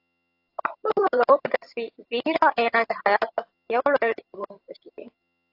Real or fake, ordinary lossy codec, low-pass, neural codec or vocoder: fake; AAC, 32 kbps; 5.4 kHz; vocoder, 22.05 kHz, 80 mel bands, HiFi-GAN